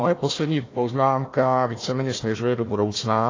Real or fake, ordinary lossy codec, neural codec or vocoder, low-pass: fake; AAC, 32 kbps; codec, 16 kHz, 1 kbps, FunCodec, trained on Chinese and English, 50 frames a second; 7.2 kHz